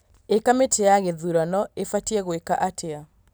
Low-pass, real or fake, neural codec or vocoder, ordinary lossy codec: none; real; none; none